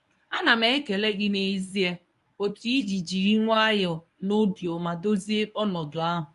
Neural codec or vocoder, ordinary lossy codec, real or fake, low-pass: codec, 24 kHz, 0.9 kbps, WavTokenizer, medium speech release version 1; none; fake; 10.8 kHz